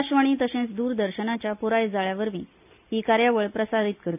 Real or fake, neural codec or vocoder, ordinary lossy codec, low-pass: real; none; none; 3.6 kHz